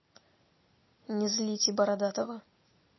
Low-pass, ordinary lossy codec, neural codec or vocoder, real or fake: 7.2 kHz; MP3, 24 kbps; none; real